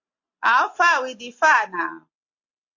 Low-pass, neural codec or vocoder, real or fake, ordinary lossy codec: 7.2 kHz; none; real; AAC, 48 kbps